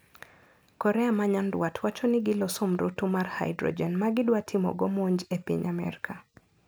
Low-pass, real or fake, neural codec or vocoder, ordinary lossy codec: none; real; none; none